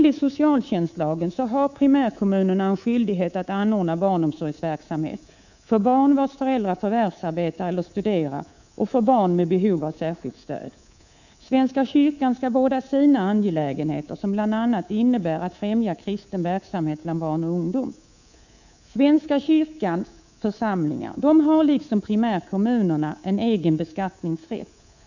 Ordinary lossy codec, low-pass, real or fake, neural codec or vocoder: none; 7.2 kHz; fake; codec, 24 kHz, 3.1 kbps, DualCodec